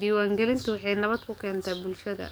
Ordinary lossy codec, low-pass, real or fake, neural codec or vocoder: none; none; fake; codec, 44.1 kHz, 7.8 kbps, DAC